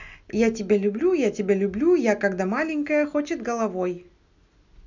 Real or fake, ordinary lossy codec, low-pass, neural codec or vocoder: real; none; 7.2 kHz; none